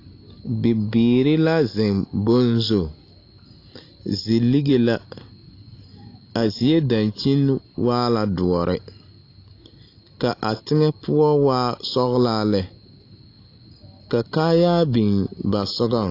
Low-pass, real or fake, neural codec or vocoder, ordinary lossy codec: 5.4 kHz; real; none; AAC, 32 kbps